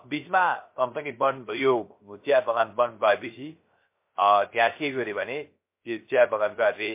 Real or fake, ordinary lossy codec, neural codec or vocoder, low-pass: fake; MP3, 24 kbps; codec, 16 kHz, 0.3 kbps, FocalCodec; 3.6 kHz